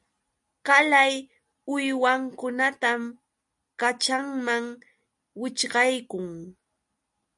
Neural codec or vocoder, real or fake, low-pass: none; real; 10.8 kHz